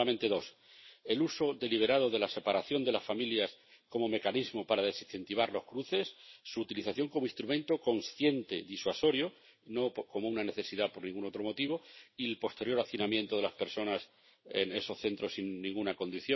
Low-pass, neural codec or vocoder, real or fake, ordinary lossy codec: 7.2 kHz; none; real; MP3, 24 kbps